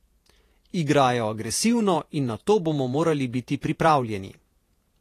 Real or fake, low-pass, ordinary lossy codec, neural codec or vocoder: fake; 14.4 kHz; AAC, 48 kbps; vocoder, 48 kHz, 128 mel bands, Vocos